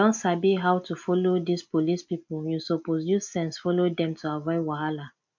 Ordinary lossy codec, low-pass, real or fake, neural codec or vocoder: MP3, 48 kbps; 7.2 kHz; real; none